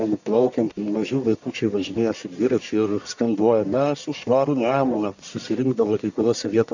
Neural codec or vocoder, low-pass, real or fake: codec, 44.1 kHz, 1.7 kbps, Pupu-Codec; 7.2 kHz; fake